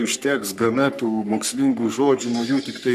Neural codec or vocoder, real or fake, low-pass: codec, 44.1 kHz, 3.4 kbps, Pupu-Codec; fake; 14.4 kHz